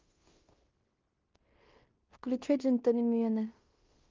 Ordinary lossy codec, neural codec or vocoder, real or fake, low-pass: Opus, 32 kbps; codec, 16 kHz in and 24 kHz out, 0.9 kbps, LongCat-Audio-Codec, fine tuned four codebook decoder; fake; 7.2 kHz